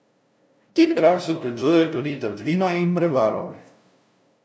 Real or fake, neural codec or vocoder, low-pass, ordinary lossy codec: fake; codec, 16 kHz, 0.5 kbps, FunCodec, trained on LibriTTS, 25 frames a second; none; none